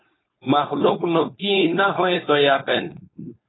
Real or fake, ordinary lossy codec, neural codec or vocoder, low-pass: fake; AAC, 16 kbps; codec, 16 kHz, 4.8 kbps, FACodec; 7.2 kHz